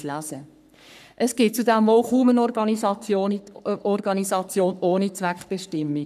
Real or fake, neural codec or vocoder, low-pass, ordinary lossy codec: fake; codec, 44.1 kHz, 3.4 kbps, Pupu-Codec; 14.4 kHz; none